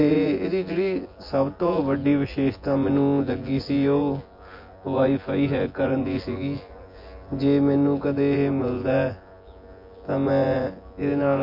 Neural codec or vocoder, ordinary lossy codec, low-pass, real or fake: vocoder, 24 kHz, 100 mel bands, Vocos; MP3, 32 kbps; 5.4 kHz; fake